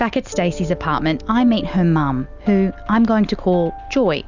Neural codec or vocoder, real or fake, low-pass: none; real; 7.2 kHz